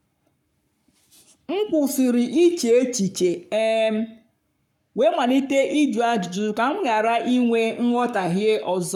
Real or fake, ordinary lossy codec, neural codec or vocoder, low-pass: fake; none; codec, 44.1 kHz, 7.8 kbps, Pupu-Codec; 19.8 kHz